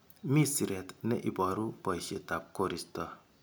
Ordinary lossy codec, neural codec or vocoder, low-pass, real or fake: none; none; none; real